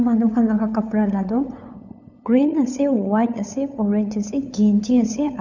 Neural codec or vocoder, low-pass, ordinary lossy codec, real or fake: codec, 16 kHz, 8 kbps, FunCodec, trained on LibriTTS, 25 frames a second; 7.2 kHz; none; fake